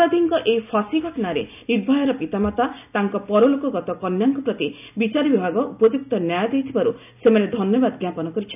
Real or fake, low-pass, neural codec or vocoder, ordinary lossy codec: real; 3.6 kHz; none; none